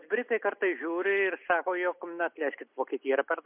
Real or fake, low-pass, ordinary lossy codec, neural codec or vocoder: real; 3.6 kHz; MP3, 32 kbps; none